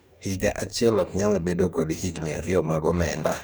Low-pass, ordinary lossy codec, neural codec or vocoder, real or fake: none; none; codec, 44.1 kHz, 2.6 kbps, DAC; fake